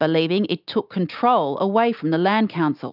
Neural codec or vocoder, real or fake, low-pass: none; real; 5.4 kHz